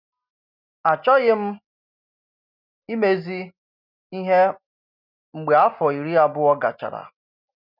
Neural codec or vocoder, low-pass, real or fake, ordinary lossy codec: none; 5.4 kHz; real; none